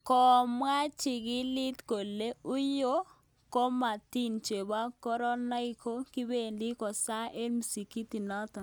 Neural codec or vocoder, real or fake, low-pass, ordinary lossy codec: none; real; none; none